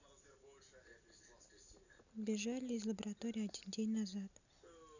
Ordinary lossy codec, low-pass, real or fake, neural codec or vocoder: none; 7.2 kHz; real; none